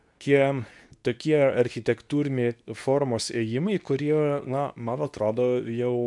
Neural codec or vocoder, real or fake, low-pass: codec, 24 kHz, 0.9 kbps, WavTokenizer, medium speech release version 2; fake; 10.8 kHz